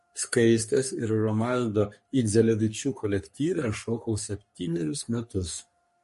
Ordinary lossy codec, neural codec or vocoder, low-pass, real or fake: MP3, 48 kbps; codec, 44.1 kHz, 3.4 kbps, Pupu-Codec; 14.4 kHz; fake